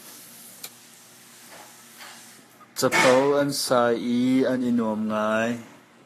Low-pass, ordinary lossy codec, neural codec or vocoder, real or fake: 14.4 kHz; AAC, 48 kbps; codec, 44.1 kHz, 7.8 kbps, Pupu-Codec; fake